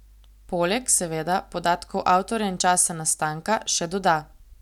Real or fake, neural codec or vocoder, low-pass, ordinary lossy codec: real; none; 19.8 kHz; none